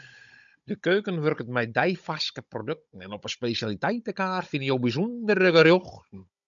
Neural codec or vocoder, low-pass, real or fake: codec, 16 kHz, 16 kbps, FunCodec, trained on Chinese and English, 50 frames a second; 7.2 kHz; fake